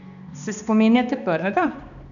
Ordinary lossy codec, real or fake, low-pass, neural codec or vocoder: none; fake; 7.2 kHz; codec, 16 kHz, 2 kbps, X-Codec, HuBERT features, trained on balanced general audio